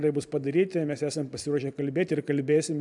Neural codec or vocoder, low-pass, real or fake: none; 10.8 kHz; real